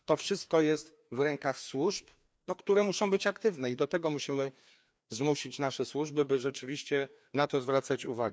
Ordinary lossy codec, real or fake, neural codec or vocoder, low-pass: none; fake; codec, 16 kHz, 2 kbps, FreqCodec, larger model; none